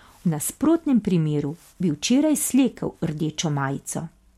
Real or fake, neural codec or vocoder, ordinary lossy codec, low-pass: real; none; MP3, 64 kbps; 19.8 kHz